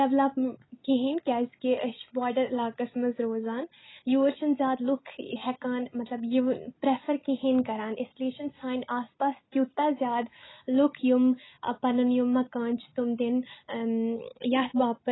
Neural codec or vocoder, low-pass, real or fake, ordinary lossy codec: none; 7.2 kHz; real; AAC, 16 kbps